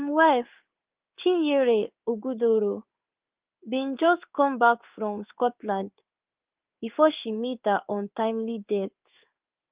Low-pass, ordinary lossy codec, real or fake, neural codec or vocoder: 3.6 kHz; Opus, 24 kbps; fake; codec, 16 kHz in and 24 kHz out, 1 kbps, XY-Tokenizer